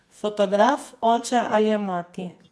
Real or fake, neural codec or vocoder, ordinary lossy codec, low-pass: fake; codec, 24 kHz, 0.9 kbps, WavTokenizer, medium music audio release; none; none